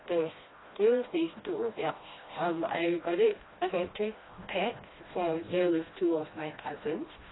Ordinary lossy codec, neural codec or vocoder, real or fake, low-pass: AAC, 16 kbps; codec, 16 kHz, 1 kbps, FreqCodec, smaller model; fake; 7.2 kHz